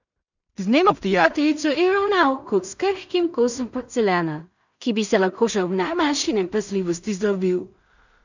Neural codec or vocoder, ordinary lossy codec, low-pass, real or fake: codec, 16 kHz in and 24 kHz out, 0.4 kbps, LongCat-Audio-Codec, two codebook decoder; none; 7.2 kHz; fake